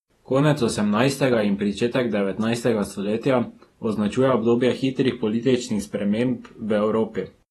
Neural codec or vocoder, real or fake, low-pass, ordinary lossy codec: vocoder, 48 kHz, 128 mel bands, Vocos; fake; 19.8 kHz; AAC, 32 kbps